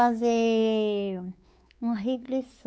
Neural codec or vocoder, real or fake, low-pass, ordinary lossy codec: codec, 16 kHz, 4 kbps, X-Codec, WavLM features, trained on Multilingual LibriSpeech; fake; none; none